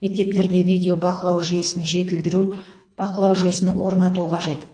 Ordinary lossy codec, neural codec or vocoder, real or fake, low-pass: AAC, 48 kbps; codec, 24 kHz, 1.5 kbps, HILCodec; fake; 9.9 kHz